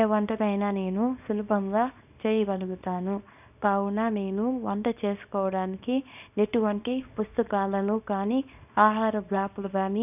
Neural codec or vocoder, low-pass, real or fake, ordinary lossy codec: codec, 24 kHz, 0.9 kbps, WavTokenizer, small release; 3.6 kHz; fake; none